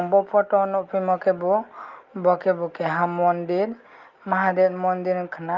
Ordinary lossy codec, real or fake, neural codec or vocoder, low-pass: Opus, 32 kbps; real; none; 7.2 kHz